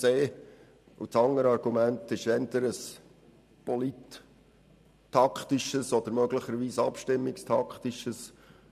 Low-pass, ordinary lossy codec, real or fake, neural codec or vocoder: 14.4 kHz; none; fake; vocoder, 44.1 kHz, 128 mel bands every 512 samples, BigVGAN v2